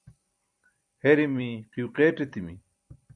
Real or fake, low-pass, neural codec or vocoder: fake; 9.9 kHz; vocoder, 44.1 kHz, 128 mel bands every 256 samples, BigVGAN v2